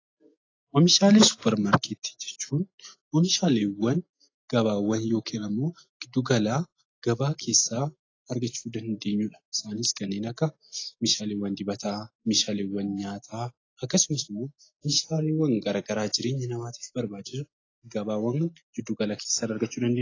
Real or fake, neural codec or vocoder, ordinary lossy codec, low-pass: real; none; AAC, 32 kbps; 7.2 kHz